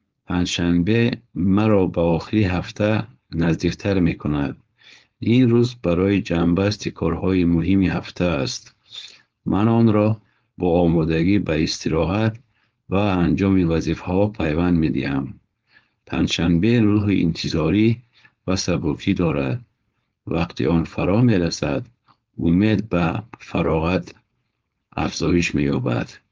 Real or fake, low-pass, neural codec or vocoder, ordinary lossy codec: fake; 7.2 kHz; codec, 16 kHz, 4.8 kbps, FACodec; Opus, 24 kbps